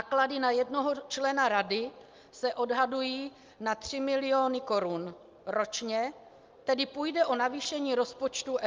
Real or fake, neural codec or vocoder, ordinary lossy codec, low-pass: real; none; Opus, 24 kbps; 7.2 kHz